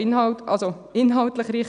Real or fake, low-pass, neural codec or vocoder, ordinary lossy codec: real; 9.9 kHz; none; none